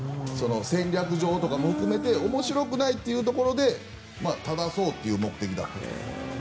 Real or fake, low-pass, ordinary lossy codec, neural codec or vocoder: real; none; none; none